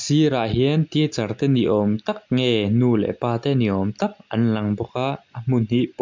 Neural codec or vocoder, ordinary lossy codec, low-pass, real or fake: none; MP3, 64 kbps; 7.2 kHz; real